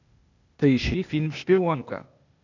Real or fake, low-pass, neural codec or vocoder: fake; 7.2 kHz; codec, 16 kHz, 0.8 kbps, ZipCodec